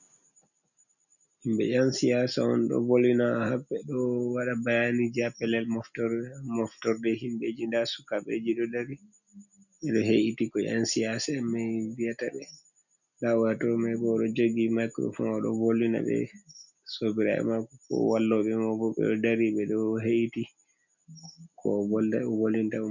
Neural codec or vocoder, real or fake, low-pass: none; real; 7.2 kHz